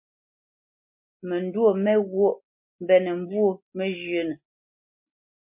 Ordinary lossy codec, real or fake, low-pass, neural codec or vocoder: MP3, 32 kbps; real; 3.6 kHz; none